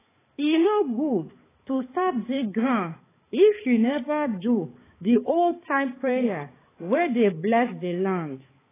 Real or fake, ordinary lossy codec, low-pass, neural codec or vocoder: fake; AAC, 16 kbps; 3.6 kHz; codec, 16 kHz, 4 kbps, X-Codec, HuBERT features, trained on balanced general audio